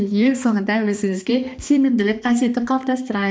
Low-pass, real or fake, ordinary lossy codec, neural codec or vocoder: none; fake; none; codec, 16 kHz, 2 kbps, X-Codec, HuBERT features, trained on balanced general audio